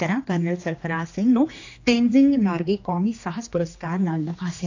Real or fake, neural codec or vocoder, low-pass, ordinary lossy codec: fake; codec, 16 kHz, 2 kbps, X-Codec, HuBERT features, trained on general audio; 7.2 kHz; AAC, 48 kbps